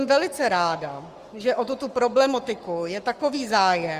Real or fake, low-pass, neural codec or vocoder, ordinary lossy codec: fake; 14.4 kHz; codec, 44.1 kHz, 7.8 kbps, Pupu-Codec; Opus, 32 kbps